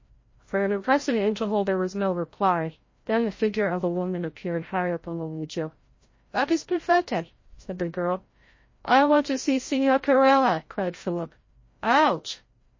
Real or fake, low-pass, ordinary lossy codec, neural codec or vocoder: fake; 7.2 kHz; MP3, 32 kbps; codec, 16 kHz, 0.5 kbps, FreqCodec, larger model